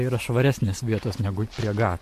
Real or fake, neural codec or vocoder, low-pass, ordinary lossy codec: real; none; 14.4 kHz; MP3, 64 kbps